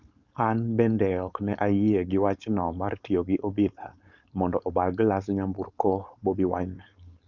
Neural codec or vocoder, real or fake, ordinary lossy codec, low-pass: codec, 16 kHz, 4.8 kbps, FACodec; fake; Opus, 64 kbps; 7.2 kHz